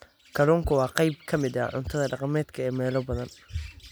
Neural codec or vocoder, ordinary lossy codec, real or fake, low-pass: none; none; real; none